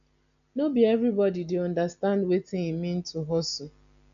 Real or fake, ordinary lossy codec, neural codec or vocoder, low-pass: real; none; none; 7.2 kHz